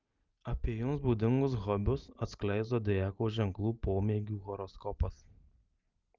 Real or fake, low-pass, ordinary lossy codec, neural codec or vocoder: real; 7.2 kHz; Opus, 32 kbps; none